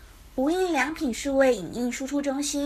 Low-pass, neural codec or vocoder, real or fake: 14.4 kHz; codec, 44.1 kHz, 7.8 kbps, Pupu-Codec; fake